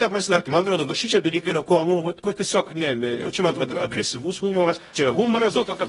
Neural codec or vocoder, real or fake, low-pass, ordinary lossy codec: codec, 24 kHz, 0.9 kbps, WavTokenizer, medium music audio release; fake; 10.8 kHz; AAC, 32 kbps